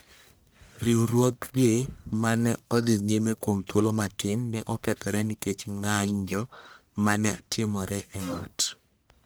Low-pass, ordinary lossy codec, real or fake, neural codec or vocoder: none; none; fake; codec, 44.1 kHz, 1.7 kbps, Pupu-Codec